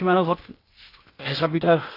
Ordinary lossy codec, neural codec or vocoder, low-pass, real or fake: AAC, 24 kbps; codec, 16 kHz, 0.8 kbps, ZipCodec; 5.4 kHz; fake